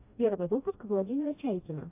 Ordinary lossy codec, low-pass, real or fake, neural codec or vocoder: AAC, 24 kbps; 3.6 kHz; fake; codec, 16 kHz, 1 kbps, FreqCodec, smaller model